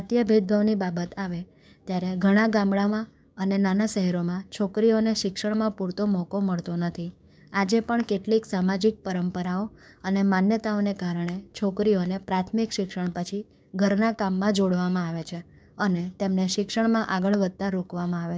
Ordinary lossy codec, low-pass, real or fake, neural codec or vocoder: none; none; fake; codec, 16 kHz, 6 kbps, DAC